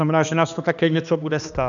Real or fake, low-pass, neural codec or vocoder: fake; 7.2 kHz; codec, 16 kHz, 2 kbps, X-Codec, HuBERT features, trained on balanced general audio